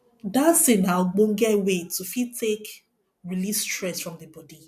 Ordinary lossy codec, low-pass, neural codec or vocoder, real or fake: none; 14.4 kHz; none; real